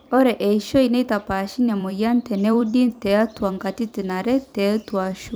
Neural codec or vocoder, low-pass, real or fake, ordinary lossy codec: vocoder, 44.1 kHz, 128 mel bands every 256 samples, BigVGAN v2; none; fake; none